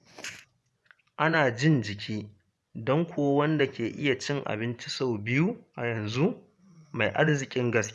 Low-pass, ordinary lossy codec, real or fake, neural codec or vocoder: none; none; fake; vocoder, 24 kHz, 100 mel bands, Vocos